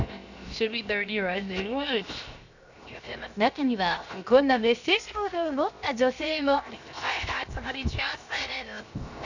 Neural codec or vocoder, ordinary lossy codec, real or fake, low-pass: codec, 16 kHz, 0.7 kbps, FocalCodec; none; fake; 7.2 kHz